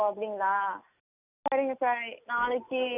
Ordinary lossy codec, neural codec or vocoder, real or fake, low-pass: none; none; real; 3.6 kHz